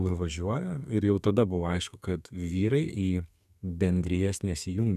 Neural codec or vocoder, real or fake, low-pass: codec, 32 kHz, 1.9 kbps, SNAC; fake; 14.4 kHz